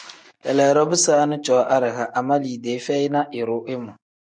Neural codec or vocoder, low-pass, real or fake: none; 9.9 kHz; real